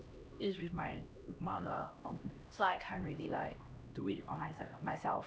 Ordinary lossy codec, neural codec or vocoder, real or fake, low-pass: none; codec, 16 kHz, 1 kbps, X-Codec, HuBERT features, trained on LibriSpeech; fake; none